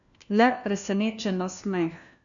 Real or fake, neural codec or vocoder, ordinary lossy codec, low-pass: fake; codec, 16 kHz, 1 kbps, FunCodec, trained on LibriTTS, 50 frames a second; none; 7.2 kHz